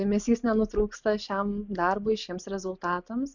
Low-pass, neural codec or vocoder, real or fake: 7.2 kHz; none; real